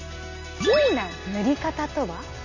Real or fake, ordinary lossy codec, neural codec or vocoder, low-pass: real; none; none; 7.2 kHz